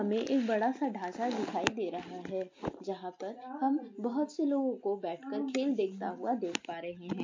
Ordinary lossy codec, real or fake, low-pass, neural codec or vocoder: MP3, 48 kbps; real; 7.2 kHz; none